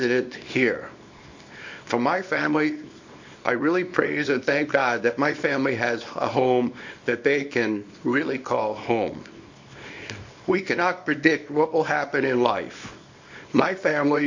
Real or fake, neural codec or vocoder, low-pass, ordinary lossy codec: fake; codec, 24 kHz, 0.9 kbps, WavTokenizer, small release; 7.2 kHz; MP3, 48 kbps